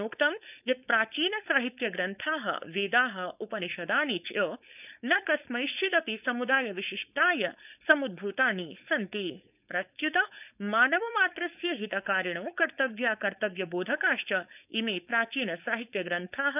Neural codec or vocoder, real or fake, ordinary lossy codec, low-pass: codec, 16 kHz, 4.8 kbps, FACodec; fake; none; 3.6 kHz